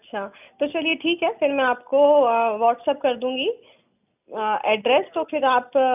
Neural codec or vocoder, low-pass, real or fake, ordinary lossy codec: none; 3.6 kHz; real; none